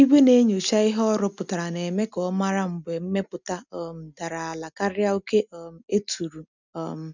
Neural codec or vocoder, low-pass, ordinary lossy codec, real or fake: none; 7.2 kHz; none; real